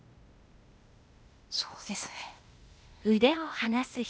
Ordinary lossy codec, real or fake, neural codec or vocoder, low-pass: none; fake; codec, 16 kHz, 0.8 kbps, ZipCodec; none